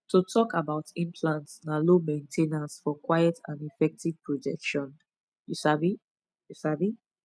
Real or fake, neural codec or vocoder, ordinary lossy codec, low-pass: real; none; none; none